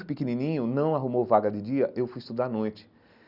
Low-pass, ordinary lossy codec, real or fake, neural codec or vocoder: 5.4 kHz; none; real; none